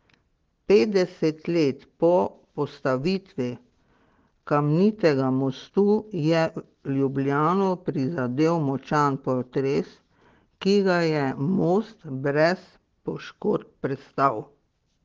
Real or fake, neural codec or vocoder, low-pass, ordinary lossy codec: real; none; 7.2 kHz; Opus, 16 kbps